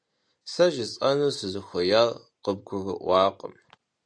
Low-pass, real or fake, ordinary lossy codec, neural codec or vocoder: 9.9 kHz; real; AAC, 64 kbps; none